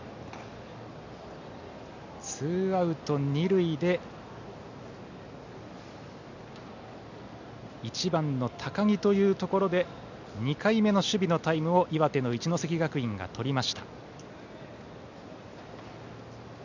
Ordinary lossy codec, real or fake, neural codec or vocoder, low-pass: none; real; none; 7.2 kHz